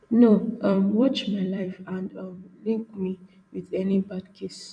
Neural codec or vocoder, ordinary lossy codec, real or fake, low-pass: vocoder, 44.1 kHz, 128 mel bands every 256 samples, BigVGAN v2; none; fake; 9.9 kHz